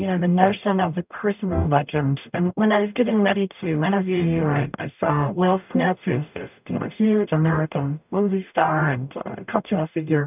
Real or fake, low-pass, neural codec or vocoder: fake; 3.6 kHz; codec, 44.1 kHz, 0.9 kbps, DAC